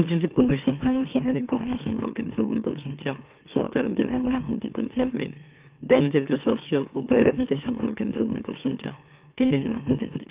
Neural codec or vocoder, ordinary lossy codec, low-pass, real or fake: autoencoder, 44.1 kHz, a latent of 192 numbers a frame, MeloTTS; Opus, 24 kbps; 3.6 kHz; fake